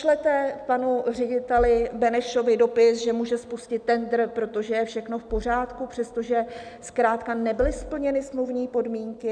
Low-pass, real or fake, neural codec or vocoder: 9.9 kHz; real; none